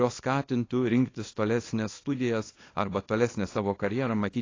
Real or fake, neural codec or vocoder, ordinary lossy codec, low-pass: fake; codec, 24 kHz, 0.9 kbps, WavTokenizer, small release; AAC, 32 kbps; 7.2 kHz